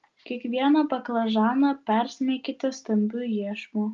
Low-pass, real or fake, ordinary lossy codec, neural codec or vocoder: 7.2 kHz; real; Opus, 32 kbps; none